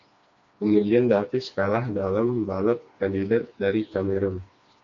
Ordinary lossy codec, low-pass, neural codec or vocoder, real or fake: MP3, 48 kbps; 7.2 kHz; codec, 16 kHz, 2 kbps, FreqCodec, smaller model; fake